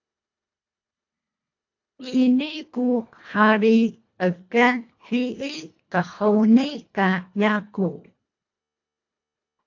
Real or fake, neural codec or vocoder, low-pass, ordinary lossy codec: fake; codec, 24 kHz, 1.5 kbps, HILCodec; 7.2 kHz; AAC, 48 kbps